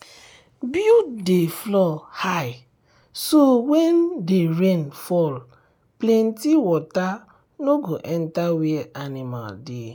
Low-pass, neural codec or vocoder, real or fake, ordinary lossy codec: none; none; real; none